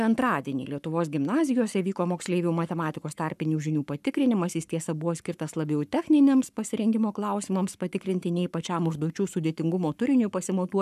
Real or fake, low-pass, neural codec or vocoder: fake; 14.4 kHz; codec, 44.1 kHz, 7.8 kbps, Pupu-Codec